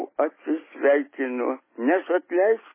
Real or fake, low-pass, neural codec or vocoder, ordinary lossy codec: real; 3.6 kHz; none; MP3, 16 kbps